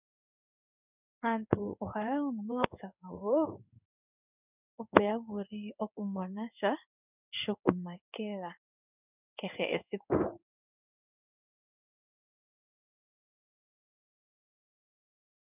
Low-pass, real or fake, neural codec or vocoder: 3.6 kHz; fake; codec, 16 kHz in and 24 kHz out, 1 kbps, XY-Tokenizer